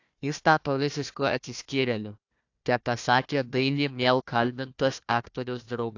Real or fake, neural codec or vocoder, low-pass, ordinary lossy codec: fake; codec, 16 kHz, 1 kbps, FunCodec, trained on Chinese and English, 50 frames a second; 7.2 kHz; AAC, 48 kbps